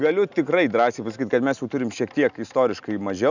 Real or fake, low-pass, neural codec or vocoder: real; 7.2 kHz; none